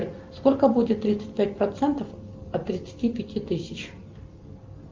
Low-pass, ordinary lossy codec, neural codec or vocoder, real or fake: 7.2 kHz; Opus, 24 kbps; none; real